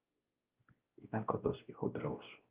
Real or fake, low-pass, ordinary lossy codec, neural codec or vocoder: fake; 3.6 kHz; Opus, 32 kbps; codec, 16 kHz, 1 kbps, X-Codec, WavLM features, trained on Multilingual LibriSpeech